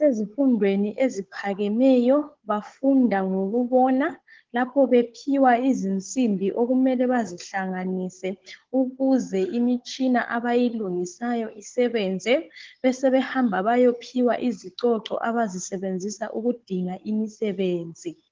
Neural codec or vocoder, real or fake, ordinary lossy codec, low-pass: codec, 16 kHz, 16 kbps, FunCodec, trained on Chinese and English, 50 frames a second; fake; Opus, 16 kbps; 7.2 kHz